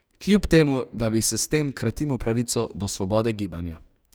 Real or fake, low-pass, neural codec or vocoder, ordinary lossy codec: fake; none; codec, 44.1 kHz, 2.6 kbps, DAC; none